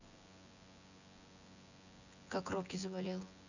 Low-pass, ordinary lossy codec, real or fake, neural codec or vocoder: 7.2 kHz; none; fake; vocoder, 24 kHz, 100 mel bands, Vocos